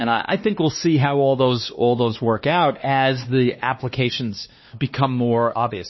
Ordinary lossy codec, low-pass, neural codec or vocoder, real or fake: MP3, 24 kbps; 7.2 kHz; codec, 16 kHz, 2 kbps, X-Codec, WavLM features, trained on Multilingual LibriSpeech; fake